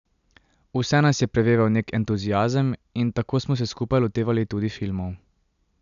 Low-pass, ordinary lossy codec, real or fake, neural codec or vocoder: 7.2 kHz; none; real; none